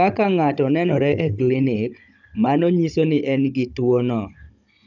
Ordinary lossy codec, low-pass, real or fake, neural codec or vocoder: none; 7.2 kHz; fake; codec, 16 kHz, 16 kbps, FunCodec, trained on Chinese and English, 50 frames a second